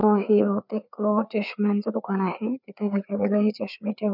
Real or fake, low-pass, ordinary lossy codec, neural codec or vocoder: fake; 5.4 kHz; none; codec, 16 kHz, 4 kbps, FreqCodec, larger model